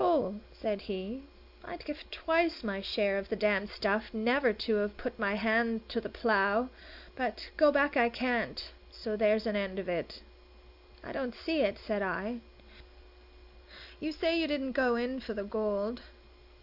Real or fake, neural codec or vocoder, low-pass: real; none; 5.4 kHz